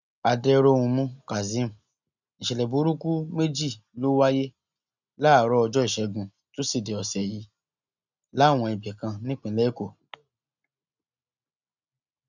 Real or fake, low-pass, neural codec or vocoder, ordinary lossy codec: real; 7.2 kHz; none; none